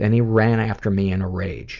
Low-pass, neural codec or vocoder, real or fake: 7.2 kHz; none; real